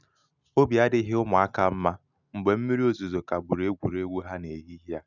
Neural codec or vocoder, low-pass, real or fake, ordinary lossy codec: none; 7.2 kHz; real; none